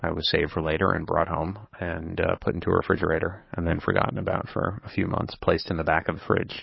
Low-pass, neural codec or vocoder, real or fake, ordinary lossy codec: 7.2 kHz; codec, 44.1 kHz, 7.8 kbps, DAC; fake; MP3, 24 kbps